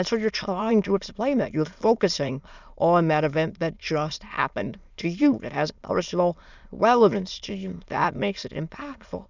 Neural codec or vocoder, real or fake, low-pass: autoencoder, 22.05 kHz, a latent of 192 numbers a frame, VITS, trained on many speakers; fake; 7.2 kHz